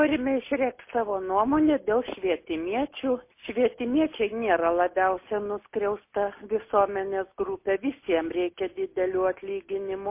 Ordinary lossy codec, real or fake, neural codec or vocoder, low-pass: MP3, 24 kbps; real; none; 3.6 kHz